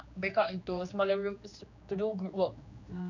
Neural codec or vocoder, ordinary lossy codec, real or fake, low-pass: codec, 16 kHz, 2 kbps, X-Codec, HuBERT features, trained on general audio; none; fake; 7.2 kHz